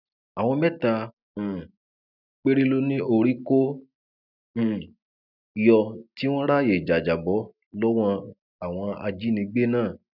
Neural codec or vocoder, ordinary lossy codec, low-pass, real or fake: none; none; 5.4 kHz; real